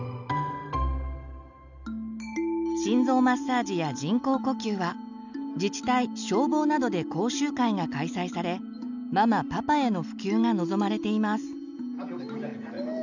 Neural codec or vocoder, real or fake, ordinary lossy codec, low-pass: none; real; none; 7.2 kHz